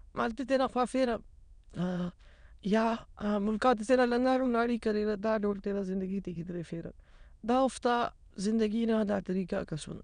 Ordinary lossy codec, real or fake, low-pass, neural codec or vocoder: none; fake; 9.9 kHz; autoencoder, 22.05 kHz, a latent of 192 numbers a frame, VITS, trained on many speakers